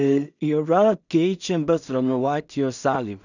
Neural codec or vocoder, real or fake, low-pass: codec, 16 kHz in and 24 kHz out, 0.4 kbps, LongCat-Audio-Codec, two codebook decoder; fake; 7.2 kHz